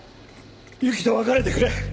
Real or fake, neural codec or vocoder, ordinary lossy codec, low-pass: real; none; none; none